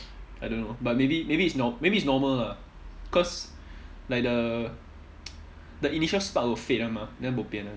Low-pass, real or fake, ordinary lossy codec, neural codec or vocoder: none; real; none; none